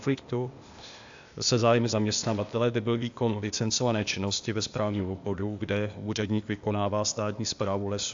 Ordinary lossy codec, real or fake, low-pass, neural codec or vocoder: AAC, 64 kbps; fake; 7.2 kHz; codec, 16 kHz, 0.8 kbps, ZipCodec